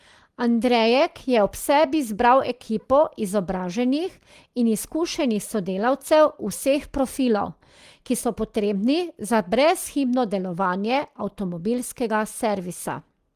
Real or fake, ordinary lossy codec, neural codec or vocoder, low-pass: real; Opus, 24 kbps; none; 14.4 kHz